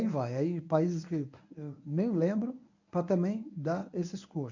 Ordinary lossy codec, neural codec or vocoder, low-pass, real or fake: none; codec, 24 kHz, 0.9 kbps, WavTokenizer, medium speech release version 1; 7.2 kHz; fake